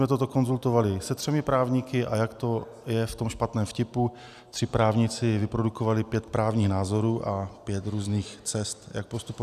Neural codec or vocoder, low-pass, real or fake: none; 14.4 kHz; real